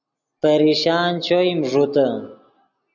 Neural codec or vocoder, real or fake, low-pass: none; real; 7.2 kHz